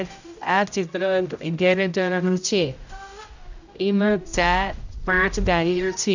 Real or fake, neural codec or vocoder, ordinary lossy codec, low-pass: fake; codec, 16 kHz, 0.5 kbps, X-Codec, HuBERT features, trained on general audio; none; 7.2 kHz